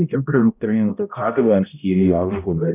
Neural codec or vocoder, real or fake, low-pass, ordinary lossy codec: codec, 16 kHz, 0.5 kbps, X-Codec, HuBERT features, trained on balanced general audio; fake; 3.6 kHz; none